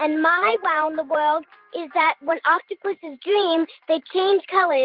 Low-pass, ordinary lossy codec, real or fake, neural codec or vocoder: 5.4 kHz; Opus, 32 kbps; fake; vocoder, 44.1 kHz, 128 mel bands every 512 samples, BigVGAN v2